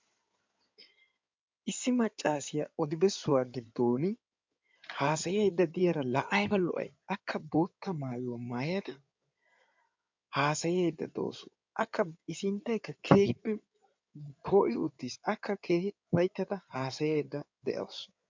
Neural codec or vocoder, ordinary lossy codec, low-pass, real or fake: codec, 16 kHz in and 24 kHz out, 2.2 kbps, FireRedTTS-2 codec; MP3, 64 kbps; 7.2 kHz; fake